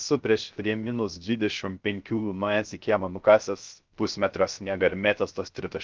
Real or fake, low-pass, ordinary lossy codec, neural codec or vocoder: fake; 7.2 kHz; Opus, 32 kbps; codec, 16 kHz, 0.3 kbps, FocalCodec